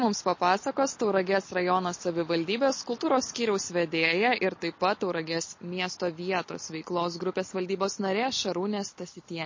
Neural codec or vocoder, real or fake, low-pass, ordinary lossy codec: none; real; 7.2 kHz; MP3, 32 kbps